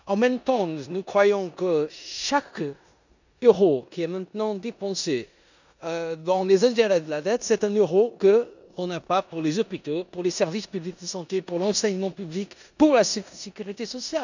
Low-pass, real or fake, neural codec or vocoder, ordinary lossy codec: 7.2 kHz; fake; codec, 16 kHz in and 24 kHz out, 0.9 kbps, LongCat-Audio-Codec, four codebook decoder; none